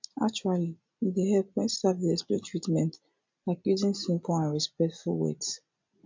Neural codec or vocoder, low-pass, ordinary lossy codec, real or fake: none; 7.2 kHz; MP3, 64 kbps; real